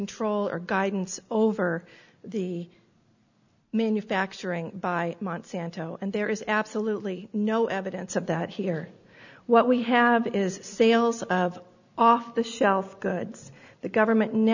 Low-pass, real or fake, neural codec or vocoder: 7.2 kHz; real; none